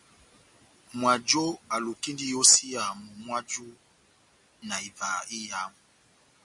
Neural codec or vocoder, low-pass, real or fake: none; 10.8 kHz; real